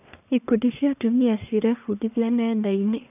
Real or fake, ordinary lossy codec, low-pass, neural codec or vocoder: fake; none; 3.6 kHz; codec, 44.1 kHz, 1.7 kbps, Pupu-Codec